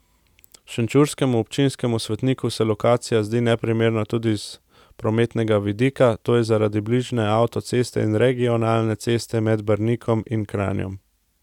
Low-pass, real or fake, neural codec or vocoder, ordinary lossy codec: 19.8 kHz; real; none; none